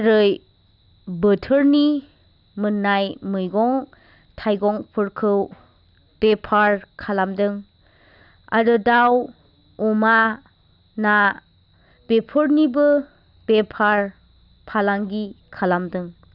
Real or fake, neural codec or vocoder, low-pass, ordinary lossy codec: real; none; 5.4 kHz; none